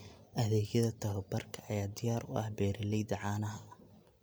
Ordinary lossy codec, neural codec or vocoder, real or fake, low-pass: none; none; real; none